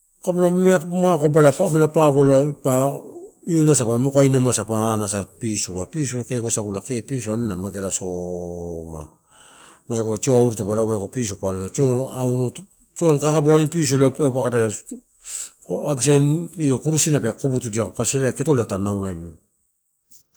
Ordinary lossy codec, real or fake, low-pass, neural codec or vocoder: none; fake; none; codec, 44.1 kHz, 2.6 kbps, SNAC